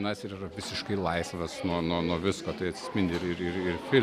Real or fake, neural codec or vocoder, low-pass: real; none; 14.4 kHz